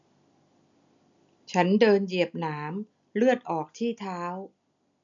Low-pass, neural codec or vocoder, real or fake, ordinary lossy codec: 7.2 kHz; none; real; MP3, 96 kbps